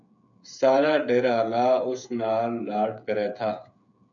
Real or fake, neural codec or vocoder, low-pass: fake; codec, 16 kHz, 8 kbps, FreqCodec, smaller model; 7.2 kHz